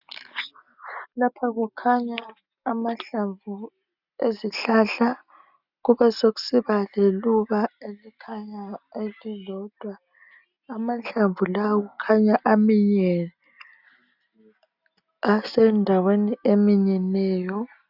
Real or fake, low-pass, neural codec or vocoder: real; 5.4 kHz; none